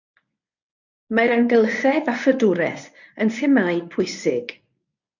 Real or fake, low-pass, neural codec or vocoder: fake; 7.2 kHz; codec, 24 kHz, 0.9 kbps, WavTokenizer, medium speech release version 1